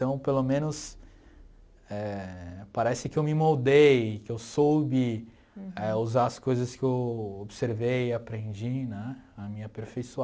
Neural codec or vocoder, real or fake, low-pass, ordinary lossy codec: none; real; none; none